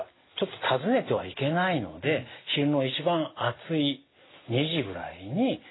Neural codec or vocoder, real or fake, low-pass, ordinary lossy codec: none; real; 7.2 kHz; AAC, 16 kbps